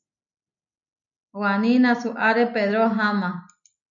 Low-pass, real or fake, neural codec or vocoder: 7.2 kHz; real; none